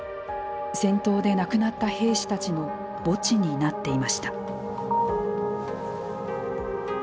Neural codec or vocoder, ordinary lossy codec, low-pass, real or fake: none; none; none; real